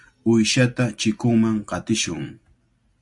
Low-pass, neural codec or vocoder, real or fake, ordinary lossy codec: 10.8 kHz; none; real; MP3, 64 kbps